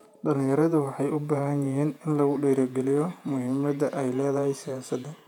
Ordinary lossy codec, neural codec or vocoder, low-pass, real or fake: none; vocoder, 48 kHz, 128 mel bands, Vocos; 19.8 kHz; fake